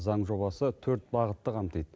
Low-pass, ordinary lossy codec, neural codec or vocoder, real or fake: none; none; none; real